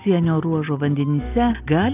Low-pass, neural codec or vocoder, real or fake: 3.6 kHz; none; real